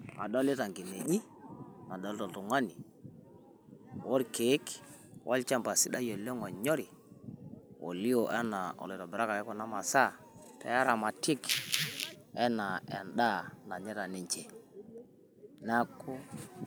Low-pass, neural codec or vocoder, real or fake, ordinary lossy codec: none; none; real; none